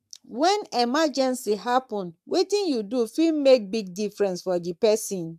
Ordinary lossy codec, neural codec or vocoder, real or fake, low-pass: AAC, 96 kbps; codec, 44.1 kHz, 7.8 kbps, Pupu-Codec; fake; 14.4 kHz